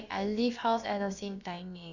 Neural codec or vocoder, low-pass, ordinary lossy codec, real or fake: codec, 16 kHz, about 1 kbps, DyCAST, with the encoder's durations; 7.2 kHz; none; fake